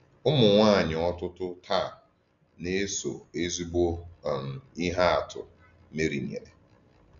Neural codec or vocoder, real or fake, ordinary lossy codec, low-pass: none; real; none; 7.2 kHz